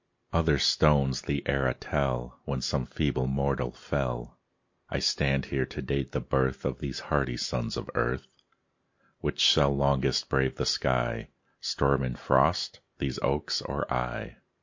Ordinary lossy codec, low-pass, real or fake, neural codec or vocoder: MP3, 48 kbps; 7.2 kHz; real; none